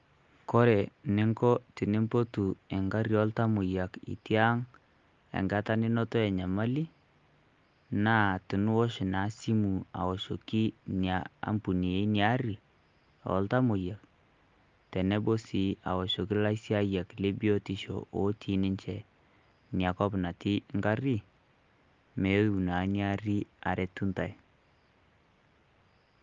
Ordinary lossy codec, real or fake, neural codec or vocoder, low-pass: Opus, 32 kbps; real; none; 7.2 kHz